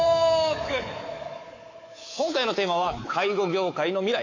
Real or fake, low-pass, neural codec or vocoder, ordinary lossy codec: fake; 7.2 kHz; codec, 24 kHz, 3.1 kbps, DualCodec; AAC, 32 kbps